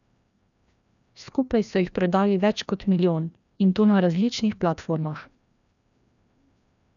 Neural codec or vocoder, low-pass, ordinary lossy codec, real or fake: codec, 16 kHz, 1 kbps, FreqCodec, larger model; 7.2 kHz; none; fake